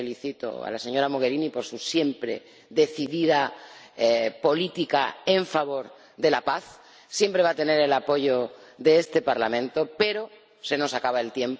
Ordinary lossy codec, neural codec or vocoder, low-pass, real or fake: none; none; none; real